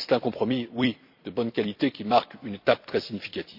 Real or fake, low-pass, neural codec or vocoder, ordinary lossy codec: fake; 5.4 kHz; vocoder, 44.1 kHz, 128 mel bands every 256 samples, BigVGAN v2; none